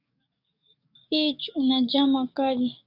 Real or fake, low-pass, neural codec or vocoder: fake; 5.4 kHz; codec, 44.1 kHz, 7.8 kbps, DAC